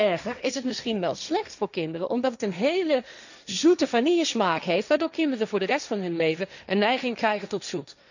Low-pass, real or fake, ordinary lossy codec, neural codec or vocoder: 7.2 kHz; fake; none; codec, 16 kHz, 1.1 kbps, Voila-Tokenizer